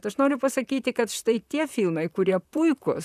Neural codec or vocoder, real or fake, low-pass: vocoder, 44.1 kHz, 128 mel bands, Pupu-Vocoder; fake; 14.4 kHz